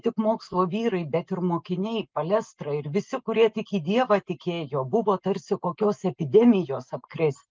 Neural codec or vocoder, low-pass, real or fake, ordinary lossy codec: none; 7.2 kHz; real; Opus, 32 kbps